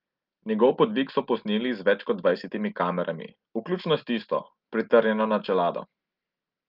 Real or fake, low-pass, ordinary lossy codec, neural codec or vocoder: real; 5.4 kHz; Opus, 24 kbps; none